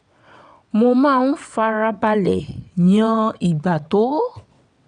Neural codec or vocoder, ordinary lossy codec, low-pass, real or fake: vocoder, 22.05 kHz, 80 mel bands, WaveNeXt; none; 9.9 kHz; fake